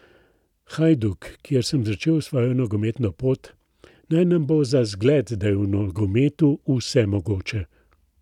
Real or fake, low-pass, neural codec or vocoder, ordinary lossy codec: real; 19.8 kHz; none; none